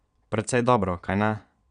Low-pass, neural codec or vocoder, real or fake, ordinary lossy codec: 9.9 kHz; vocoder, 22.05 kHz, 80 mel bands, Vocos; fake; none